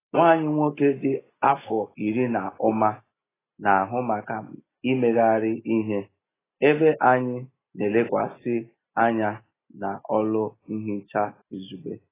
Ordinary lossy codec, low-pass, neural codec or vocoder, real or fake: AAC, 16 kbps; 3.6 kHz; none; real